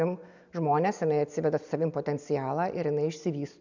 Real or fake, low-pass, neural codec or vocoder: real; 7.2 kHz; none